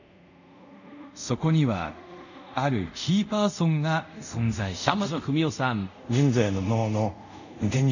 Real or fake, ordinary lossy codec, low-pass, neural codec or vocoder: fake; none; 7.2 kHz; codec, 24 kHz, 0.5 kbps, DualCodec